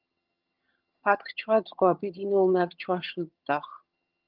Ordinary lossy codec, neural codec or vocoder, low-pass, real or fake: Opus, 32 kbps; vocoder, 22.05 kHz, 80 mel bands, HiFi-GAN; 5.4 kHz; fake